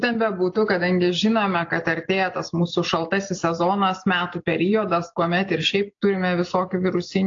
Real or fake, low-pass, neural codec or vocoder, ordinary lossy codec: real; 7.2 kHz; none; AAC, 48 kbps